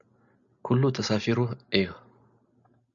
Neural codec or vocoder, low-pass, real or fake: none; 7.2 kHz; real